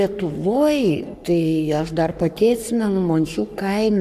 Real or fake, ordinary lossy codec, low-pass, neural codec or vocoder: fake; MP3, 96 kbps; 14.4 kHz; codec, 44.1 kHz, 3.4 kbps, Pupu-Codec